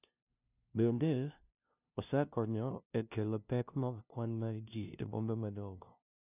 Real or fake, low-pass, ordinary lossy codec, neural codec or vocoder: fake; 3.6 kHz; none; codec, 16 kHz, 0.5 kbps, FunCodec, trained on LibriTTS, 25 frames a second